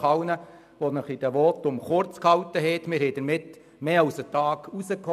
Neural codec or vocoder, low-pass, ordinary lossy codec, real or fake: none; 14.4 kHz; none; real